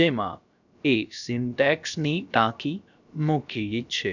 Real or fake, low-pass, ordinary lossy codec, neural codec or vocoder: fake; 7.2 kHz; none; codec, 16 kHz, about 1 kbps, DyCAST, with the encoder's durations